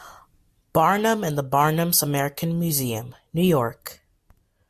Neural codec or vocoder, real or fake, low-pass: none; real; 14.4 kHz